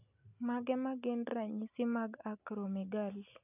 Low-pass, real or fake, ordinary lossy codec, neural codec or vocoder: 3.6 kHz; real; none; none